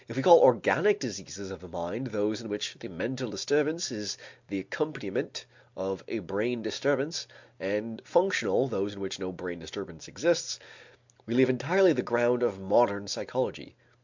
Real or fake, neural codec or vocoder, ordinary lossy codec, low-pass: real; none; MP3, 64 kbps; 7.2 kHz